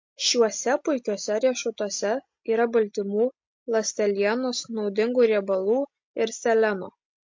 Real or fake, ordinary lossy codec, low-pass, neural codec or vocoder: real; MP3, 48 kbps; 7.2 kHz; none